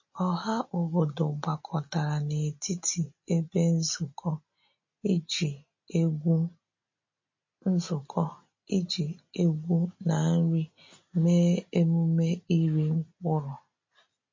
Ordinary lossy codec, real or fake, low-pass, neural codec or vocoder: MP3, 32 kbps; real; 7.2 kHz; none